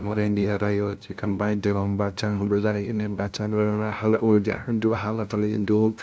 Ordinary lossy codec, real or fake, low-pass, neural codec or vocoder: none; fake; none; codec, 16 kHz, 0.5 kbps, FunCodec, trained on LibriTTS, 25 frames a second